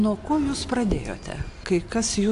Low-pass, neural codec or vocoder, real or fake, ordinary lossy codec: 10.8 kHz; none; real; AAC, 64 kbps